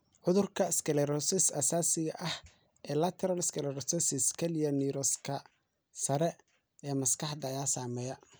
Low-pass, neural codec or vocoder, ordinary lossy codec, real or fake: none; none; none; real